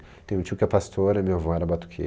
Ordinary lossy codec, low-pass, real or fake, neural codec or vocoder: none; none; real; none